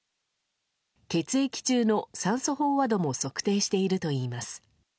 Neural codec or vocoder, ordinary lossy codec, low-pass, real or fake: none; none; none; real